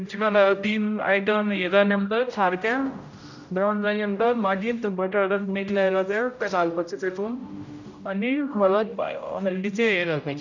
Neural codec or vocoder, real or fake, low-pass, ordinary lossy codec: codec, 16 kHz, 0.5 kbps, X-Codec, HuBERT features, trained on general audio; fake; 7.2 kHz; none